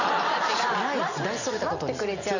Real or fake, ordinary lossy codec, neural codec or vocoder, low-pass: real; none; none; 7.2 kHz